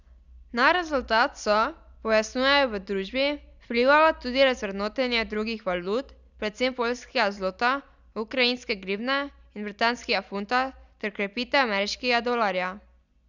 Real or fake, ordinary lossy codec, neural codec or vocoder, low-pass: real; none; none; 7.2 kHz